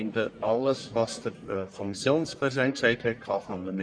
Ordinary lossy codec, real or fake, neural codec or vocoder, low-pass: none; fake; codec, 44.1 kHz, 1.7 kbps, Pupu-Codec; 9.9 kHz